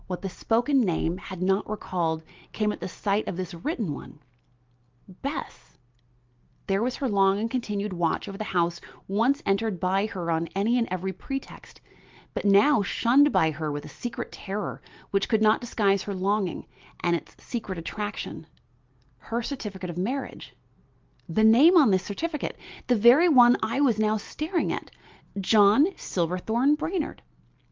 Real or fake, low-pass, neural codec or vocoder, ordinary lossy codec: real; 7.2 kHz; none; Opus, 32 kbps